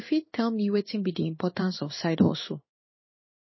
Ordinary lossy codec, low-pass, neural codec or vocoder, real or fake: MP3, 24 kbps; 7.2 kHz; codec, 16 kHz in and 24 kHz out, 1 kbps, XY-Tokenizer; fake